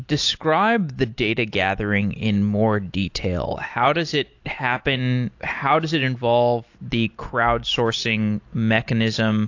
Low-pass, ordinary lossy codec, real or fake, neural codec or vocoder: 7.2 kHz; AAC, 48 kbps; real; none